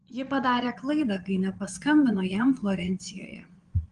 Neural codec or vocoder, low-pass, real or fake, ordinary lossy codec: vocoder, 22.05 kHz, 80 mel bands, Vocos; 9.9 kHz; fake; Opus, 24 kbps